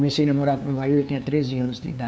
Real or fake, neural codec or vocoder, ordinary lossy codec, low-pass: fake; codec, 16 kHz, 2 kbps, FunCodec, trained on LibriTTS, 25 frames a second; none; none